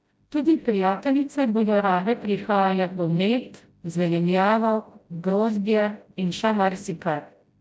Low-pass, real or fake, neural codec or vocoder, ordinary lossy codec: none; fake; codec, 16 kHz, 0.5 kbps, FreqCodec, smaller model; none